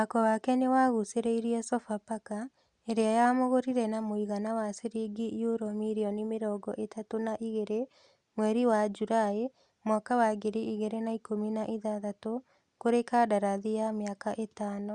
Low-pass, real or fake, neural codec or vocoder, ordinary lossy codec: 10.8 kHz; real; none; Opus, 64 kbps